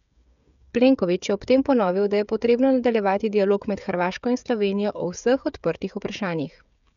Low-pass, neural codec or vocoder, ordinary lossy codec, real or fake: 7.2 kHz; codec, 16 kHz, 16 kbps, FreqCodec, smaller model; none; fake